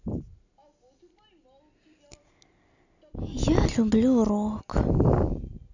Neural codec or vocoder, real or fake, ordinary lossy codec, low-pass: none; real; none; 7.2 kHz